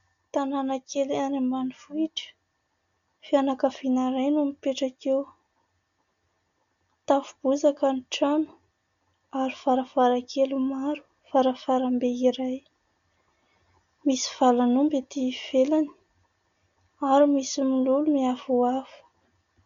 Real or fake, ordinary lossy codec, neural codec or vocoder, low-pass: real; MP3, 96 kbps; none; 7.2 kHz